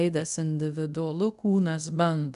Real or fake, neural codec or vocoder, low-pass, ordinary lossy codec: fake; codec, 24 kHz, 0.5 kbps, DualCodec; 10.8 kHz; MP3, 96 kbps